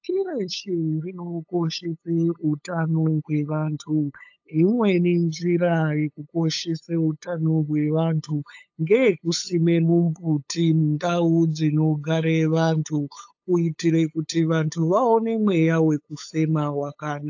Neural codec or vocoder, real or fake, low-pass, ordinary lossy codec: codec, 16 kHz, 8 kbps, FunCodec, trained on LibriTTS, 25 frames a second; fake; 7.2 kHz; AAC, 48 kbps